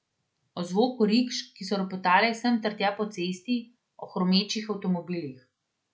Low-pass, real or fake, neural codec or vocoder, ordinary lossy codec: none; real; none; none